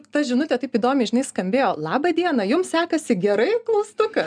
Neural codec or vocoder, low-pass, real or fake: none; 9.9 kHz; real